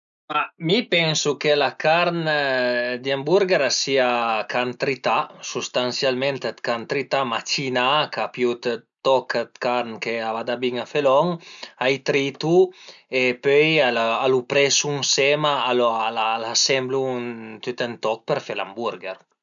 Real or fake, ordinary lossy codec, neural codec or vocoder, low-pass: real; none; none; 7.2 kHz